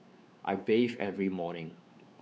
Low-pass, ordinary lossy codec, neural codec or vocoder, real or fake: none; none; codec, 16 kHz, 4 kbps, X-Codec, WavLM features, trained on Multilingual LibriSpeech; fake